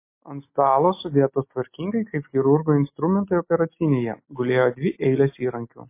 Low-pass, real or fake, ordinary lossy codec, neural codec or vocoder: 3.6 kHz; real; MP3, 24 kbps; none